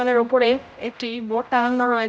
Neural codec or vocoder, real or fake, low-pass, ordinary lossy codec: codec, 16 kHz, 0.5 kbps, X-Codec, HuBERT features, trained on general audio; fake; none; none